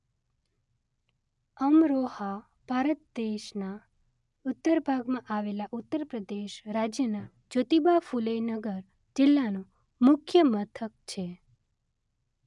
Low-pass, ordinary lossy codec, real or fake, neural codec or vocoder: 10.8 kHz; none; real; none